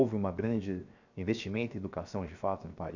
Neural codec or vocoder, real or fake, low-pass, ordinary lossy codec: codec, 16 kHz, 0.7 kbps, FocalCodec; fake; 7.2 kHz; none